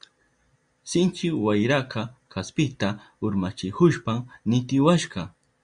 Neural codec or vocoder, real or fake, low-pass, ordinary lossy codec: none; real; 9.9 kHz; Opus, 64 kbps